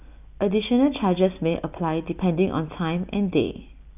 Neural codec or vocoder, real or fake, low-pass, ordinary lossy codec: none; real; 3.6 kHz; none